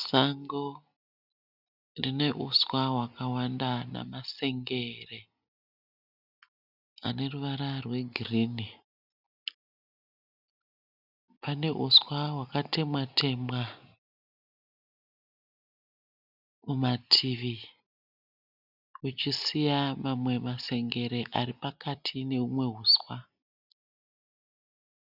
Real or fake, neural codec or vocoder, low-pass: real; none; 5.4 kHz